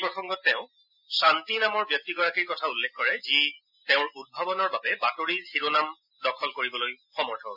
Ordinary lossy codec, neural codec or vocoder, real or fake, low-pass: none; none; real; 5.4 kHz